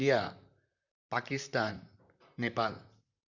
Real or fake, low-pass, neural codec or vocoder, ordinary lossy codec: fake; 7.2 kHz; vocoder, 44.1 kHz, 128 mel bands, Pupu-Vocoder; none